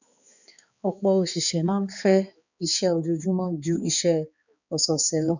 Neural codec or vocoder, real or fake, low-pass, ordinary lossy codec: codec, 16 kHz, 4 kbps, X-Codec, HuBERT features, trained on balanced general audio; fake; 7.2 kHz; none